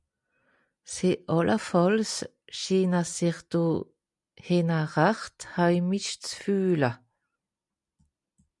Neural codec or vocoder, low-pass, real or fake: none; 10.8 kHz; real